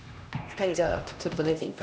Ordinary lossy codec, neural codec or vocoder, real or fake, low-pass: none; codec, 16 kHz, 1 kbps, X-Codec, HuBERT features, trained on LibriSpeech; fake; none